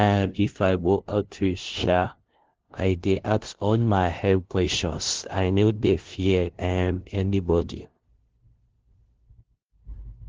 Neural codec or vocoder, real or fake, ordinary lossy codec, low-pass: codec, 16 kHz, 0.5 kbps, FunCodec, trained on LibriTTS, 25 frames a second; fake; Opus, 16 kbps; 7.2 kHz